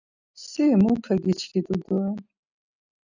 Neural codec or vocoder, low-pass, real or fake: none; 7.2 kHz; real